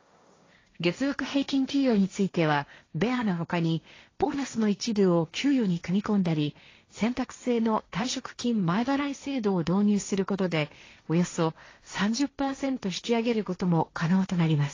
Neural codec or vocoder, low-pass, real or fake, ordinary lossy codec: codec, 16 kHz, 1.1 kbps, Voila-Tokenizer; 7.2 kHz; fake; AAC, 32 kbps